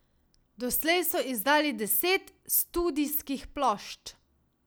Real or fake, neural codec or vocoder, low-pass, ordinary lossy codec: real; none; none; none